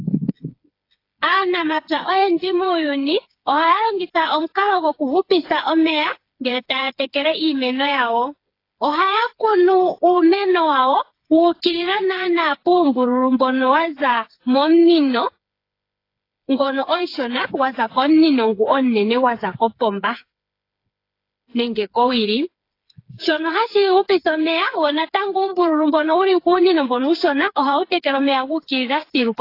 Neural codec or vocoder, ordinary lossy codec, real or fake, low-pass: codec, 16 kHz, 4 kbps, FreqCodec, smaller model; AAC, 32 kbps; fake; 5.4 kHz